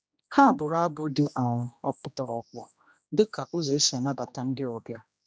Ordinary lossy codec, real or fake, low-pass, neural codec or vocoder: none; fake; none; codec, 16 kHz, 1 kbps, X-Codec, HuBERT features, trained on general audio